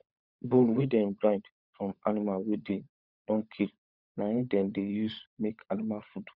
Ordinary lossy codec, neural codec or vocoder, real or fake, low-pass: Opus, 64 kbps; codec, 16 kHz, 16 kbps, FunCodec, trained on LibriTTS, 50 frames a second; fake; 5.4 kHz